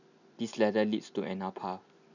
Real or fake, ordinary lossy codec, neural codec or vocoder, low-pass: real; none; none; 7.2 kHz